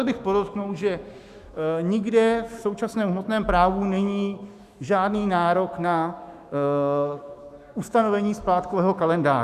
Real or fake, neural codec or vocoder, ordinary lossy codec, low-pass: fake; autoencoder, 48 kHz, 128 numbers a frame, DAC-VAE, trained on Japanese speech; Opus, 64 kbps; 14.4 kHz